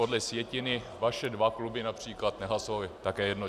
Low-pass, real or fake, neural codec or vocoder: 14.4 kHz; real; none